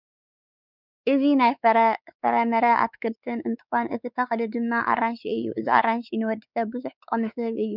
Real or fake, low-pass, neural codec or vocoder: fake; 5.4 kHz; codec, 16 kHz, 4 kbps, X-Codec, WavLM features, trained on Multilingual LibriSpeech